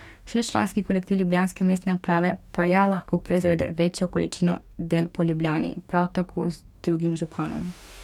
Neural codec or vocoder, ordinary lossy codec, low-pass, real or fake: codec, 44.1 kHz, 2.6 kbps, DAC; none; 19.8 kHz; fake